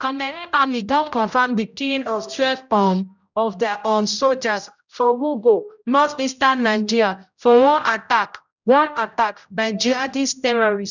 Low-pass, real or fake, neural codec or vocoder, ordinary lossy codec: 7.2 kHz; fake; codec, 16 kHz, 0.5 kbps, X-Codec, HuBERT features, trained on general audio; none